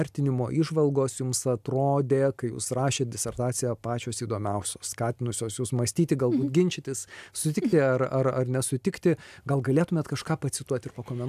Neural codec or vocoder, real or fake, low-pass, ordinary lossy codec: none; real; 14.4 kHz; AAC, 96 kbps